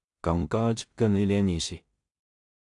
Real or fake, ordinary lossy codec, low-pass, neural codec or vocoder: fake; AAC, 64 kbps; 10.8 kHz; codec, 16 kHz in and 24 kHz out, 0.4 kbps, LongCat-Audio-Codec, two codebook decoder